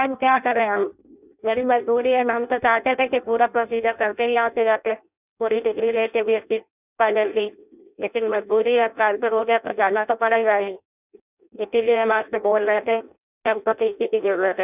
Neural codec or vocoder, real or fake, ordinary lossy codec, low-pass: codec, 16 kHz in and 24 kHz out, 0.6 kbps, FireRedTTS-2 codec; fake; none; 3.6 kHz